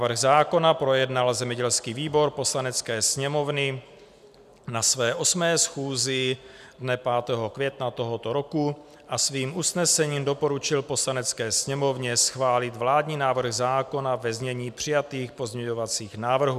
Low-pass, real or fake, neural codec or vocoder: 14.4 kHz; real; none